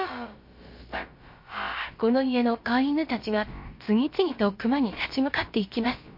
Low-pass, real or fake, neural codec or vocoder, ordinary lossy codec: 5.4 kHz; fake; codec, 16 kHz, about 1 kbps, DyCAST, with the encoder's durations; MP3, 32 kbps